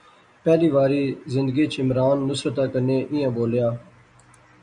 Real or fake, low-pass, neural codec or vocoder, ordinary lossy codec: real; 9.9 kHz; none; MP3, 96 kbps